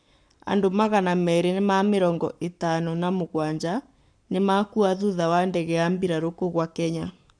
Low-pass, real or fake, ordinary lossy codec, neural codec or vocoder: 9.9 kHz; fake; none; codec, 44.1 kHz, 7.8 kbps, Pupu-Codec